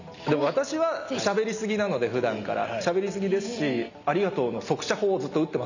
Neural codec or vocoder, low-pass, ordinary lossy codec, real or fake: none; 7.2 kHz; none; real